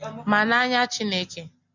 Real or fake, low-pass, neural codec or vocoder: real; 7.2 kHz; none